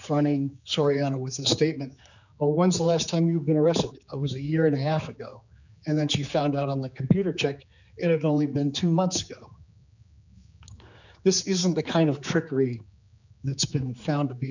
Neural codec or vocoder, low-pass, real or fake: codec, 16 kHz, 4 kbps, X-Codec, HuBERT features, trained on general audio; 7.2 kHz; fake